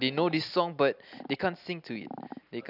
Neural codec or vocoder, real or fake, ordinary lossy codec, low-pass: none; real; none; 5.4 kHz